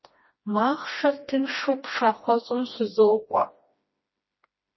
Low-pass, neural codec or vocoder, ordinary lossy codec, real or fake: 7.2 kHz; codec, 16 kHz, 1 kbps, FreqCodec, smaller model; MP3, 24 kbps; fake